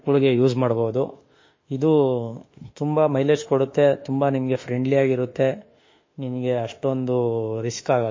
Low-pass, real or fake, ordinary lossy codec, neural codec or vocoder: 7.2 kHz; fake; MP3, 32 kbps; autoencoder, 48 kHz, 32 numbers a frame, DAC-VAE, trained on Japanese speech